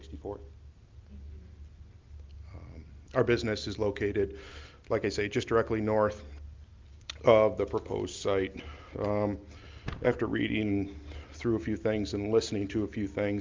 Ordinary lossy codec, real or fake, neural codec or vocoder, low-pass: Opus, 24 kbps; real; none; 7.2 kHz